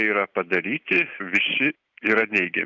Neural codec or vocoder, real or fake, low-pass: none; real; 7.2 kHz